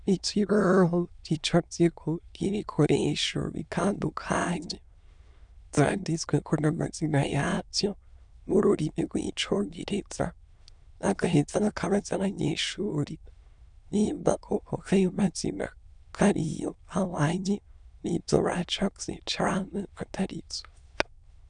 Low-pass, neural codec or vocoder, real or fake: 9.9 kHz; autoencoder, 22.05 kHz, a latent of 192 numbers a frame, VITS, trained on many speakers; fake